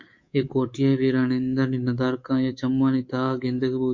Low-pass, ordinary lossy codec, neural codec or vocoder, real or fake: 7.2 kHz; MP3, 48 kbps; codec, 24 kHz, 3.1 kbps, DualCodec; fake